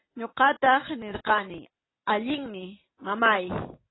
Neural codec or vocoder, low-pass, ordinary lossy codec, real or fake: none; 7.2 kHz; AAC, 16 kbps; real